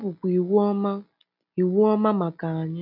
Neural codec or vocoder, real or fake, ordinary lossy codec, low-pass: none; real; none; 5.4 kHz